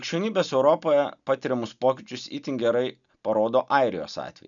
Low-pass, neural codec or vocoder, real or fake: 7.2 kHz; none; real